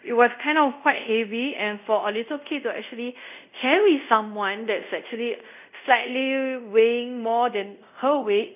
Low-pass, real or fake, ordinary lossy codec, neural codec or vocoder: 3.6 kHz; fake; none; codec, 24 kHz, 0.5 kbps, DualCodec